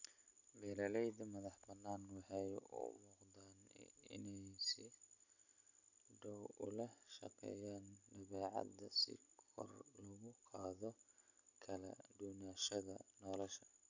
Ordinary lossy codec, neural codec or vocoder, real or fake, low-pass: none; none; real; 7.2 kHz